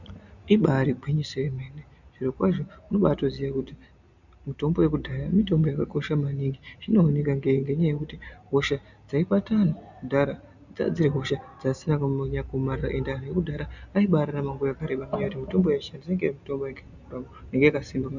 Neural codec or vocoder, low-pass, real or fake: none; 7.2 kHz; real